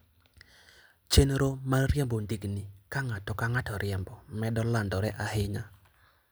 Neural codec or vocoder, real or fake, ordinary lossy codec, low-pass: none; real; none; none